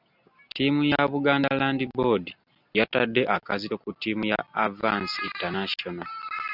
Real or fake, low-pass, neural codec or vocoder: real; 5.4 kHz; none